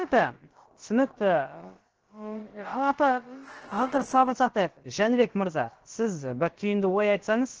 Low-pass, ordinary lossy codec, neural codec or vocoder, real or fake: 7.2 kHz; Opus, 16 kbps; codec, 16 kHz, about 1 kbps, DyCAST, with the encoder's durations; fake